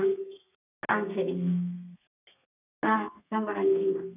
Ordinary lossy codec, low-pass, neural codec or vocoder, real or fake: none; 3.6 kHz; codec, 32 kHz, 1.9 kbps, SNAC; fake